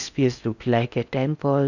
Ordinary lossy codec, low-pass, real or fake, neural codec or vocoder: none; 7.2 kHz; fake; codec, 16 kHz in and 24 kHz out, 0.6 kbps, FocalCodec, streaming, 4096 codes